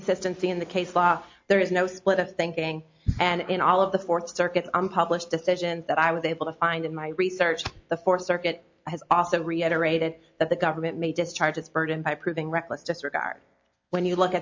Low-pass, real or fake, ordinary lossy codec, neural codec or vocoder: 7.2 kHz; real; MP3, 48 kbps; none